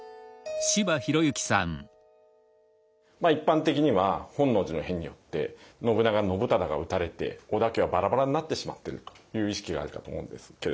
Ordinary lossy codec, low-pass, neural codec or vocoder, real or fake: none; none; none; real